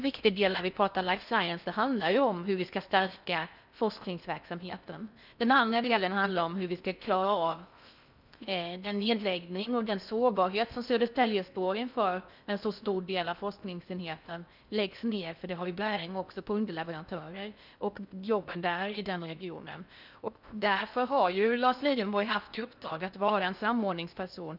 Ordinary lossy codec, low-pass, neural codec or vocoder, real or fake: none; 5.4 kHz; codec, 16 kHz in and 24 kHz out, 0.6 kbps, FocalCodec, streaming, 4096 codes; fake